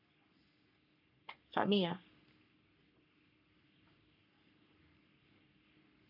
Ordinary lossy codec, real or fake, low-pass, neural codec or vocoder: none; fake; 5.4 kHz; codec, 44.1 kHz, 3.4 kbps, Pupu-Codec